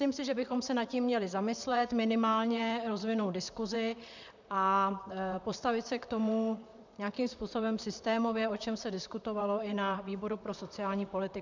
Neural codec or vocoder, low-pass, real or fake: vocoder, 44.1 kHz, 128 mel bands, Pupu-Vocoder; 7.2 kHz; fake